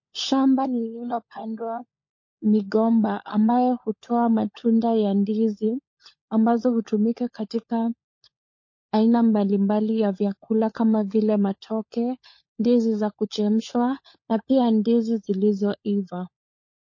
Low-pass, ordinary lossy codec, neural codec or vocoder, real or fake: 7.2 kHz; MP3, 32 kbps; codec, 16 kHz, 16 kbps, FunCodec, trained on LibriTTS, 50 frames a second; fake